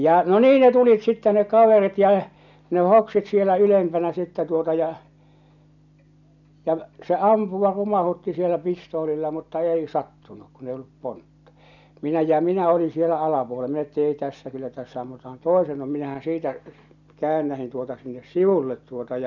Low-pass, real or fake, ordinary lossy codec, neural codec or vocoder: 7.2 kHz; real; none; none